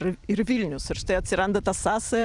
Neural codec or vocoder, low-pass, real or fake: vocoder, 44.1 kHz, 128 mel bands every 256 samples, BigVGAN v2; 10.8 kHz; fake